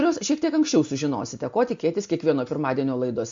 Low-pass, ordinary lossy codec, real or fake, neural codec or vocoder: 7.2 kHz; MP3, 48 kbps; real; none